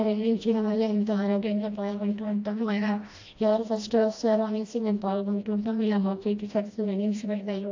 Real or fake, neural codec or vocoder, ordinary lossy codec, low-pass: fake; codec, 16 kHz, 1 kbps, FreqCodec, smaller model; AAC, 48 kbps; 7.2 kHz